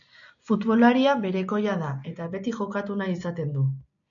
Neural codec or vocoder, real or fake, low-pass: none; real; 7.2 kHz